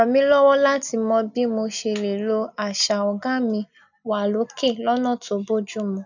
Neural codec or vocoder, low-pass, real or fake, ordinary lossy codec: none; 7.2 kHz; real; none